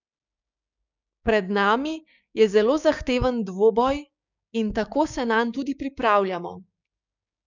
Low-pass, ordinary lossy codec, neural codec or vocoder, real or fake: 7.2 kHz; none; codec, 16 kHz, 6 kbps, DAC; fake